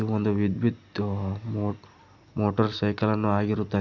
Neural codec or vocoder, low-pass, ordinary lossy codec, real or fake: none; 7.2 kHz; none; real